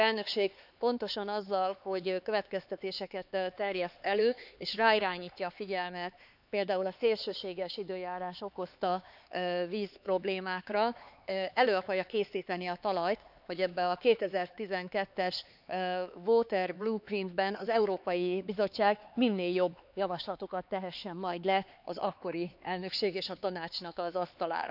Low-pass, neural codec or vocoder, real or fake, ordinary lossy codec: 5.4 kHz; codec, 16 kHz, 4 kbps, X-Codec, HuBERT features, trained on LibriSpeech; fake; none